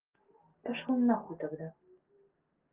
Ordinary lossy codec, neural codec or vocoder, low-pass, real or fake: Opus, 16 kbps; none; 3.6 kHz; real